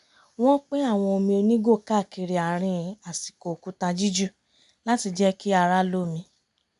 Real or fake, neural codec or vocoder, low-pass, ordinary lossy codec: real; none; 10.8 kHz; MP3, 96 kbps